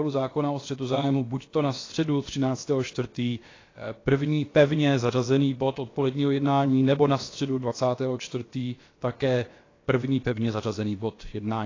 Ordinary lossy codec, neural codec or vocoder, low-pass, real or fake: AAC, 32 kbps; codec, 16 kHz, about 1 kbps, DyCAST, with the encoder's durations; 7.2 kHz; fake